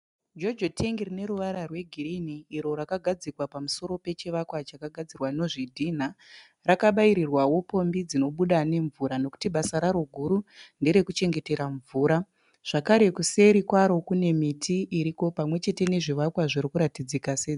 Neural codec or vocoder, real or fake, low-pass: none; real; 10.8 kHz